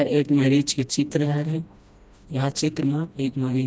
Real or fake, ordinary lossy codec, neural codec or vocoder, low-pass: fake; none; codec, 16 kHz, 1 kbps, FreqCodec, smaller model; none